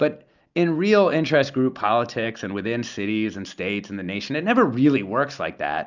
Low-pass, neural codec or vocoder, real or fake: 7.2 kHz; none; real